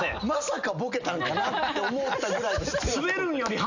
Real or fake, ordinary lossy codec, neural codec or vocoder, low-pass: real; none; none; 7.2 kHz